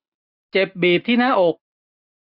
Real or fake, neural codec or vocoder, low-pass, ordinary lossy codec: real; none; 5.4 kHz; none